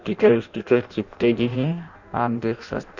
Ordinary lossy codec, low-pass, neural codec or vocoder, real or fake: none; 7.2 kHz; codec, 16 kHz in and 24 kHz out, 0.6 kbps, FireRedTTS-2 codec; fake